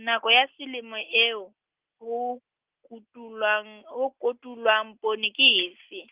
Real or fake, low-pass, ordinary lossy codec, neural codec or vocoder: real; 3.6 kHz; Opus, 32 kbps; none